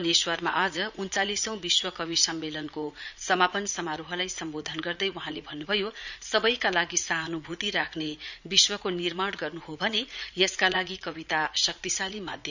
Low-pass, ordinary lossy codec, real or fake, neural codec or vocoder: 7.2 kHz; none; fake; vocoder, 44.1 kHz, 80 mel bands, Vocos